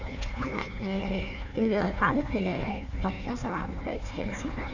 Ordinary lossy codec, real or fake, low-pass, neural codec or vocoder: none; fake; 7.2 kHz; codec, 16 kHz, 1 kbps, FunCodec, trained on Chinese and English, 50 frames a second